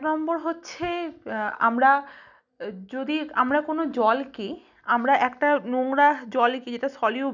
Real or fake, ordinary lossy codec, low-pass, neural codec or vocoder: real; none; 7.2 kHz; none